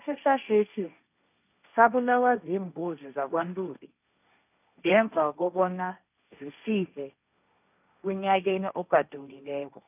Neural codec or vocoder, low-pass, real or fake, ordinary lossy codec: codec, 16 kHz, 1.1 kbps, Voila-Tokenizer; 3.6 kHz; fake; none